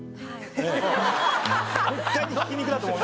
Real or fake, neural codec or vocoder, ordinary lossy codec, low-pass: real; none; none; none